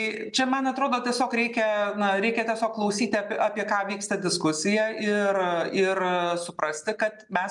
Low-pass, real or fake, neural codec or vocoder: 10.8 kHz; real; none